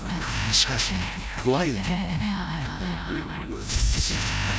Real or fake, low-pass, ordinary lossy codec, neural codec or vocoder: fake; none; none; codec, 16 kHz, 0.5 kbps, FreqCodec, larger model